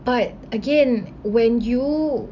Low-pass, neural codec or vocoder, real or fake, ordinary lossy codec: 7.2 kHz; none; real; none